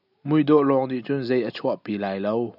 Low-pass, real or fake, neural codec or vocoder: 5.4 kHz; real; none